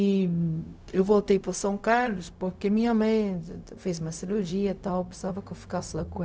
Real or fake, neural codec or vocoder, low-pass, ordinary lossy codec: fake; codec, 16 kHz, 0.4 kbps, LongCat-Audio-Codec; none; none